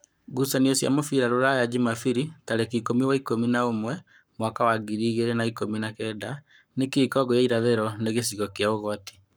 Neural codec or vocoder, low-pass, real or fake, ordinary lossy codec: codec, 44.1 kHz, 7.8 kbps, Pupu-Codec; none; fake; none